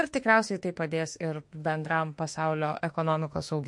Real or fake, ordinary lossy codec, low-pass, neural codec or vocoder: fake; MP3, 48 kbps; 10.8 kHz; autoencoder, 48 kHz, 32 numbers a frame, DAC-VAE, trained on Japanese speech